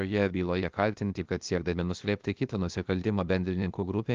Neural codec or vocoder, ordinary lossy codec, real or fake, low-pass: codec, 16 kHz, 0.8 kbps, ZipCodec; Opus, 24 kbps; fake; 7.2 kHz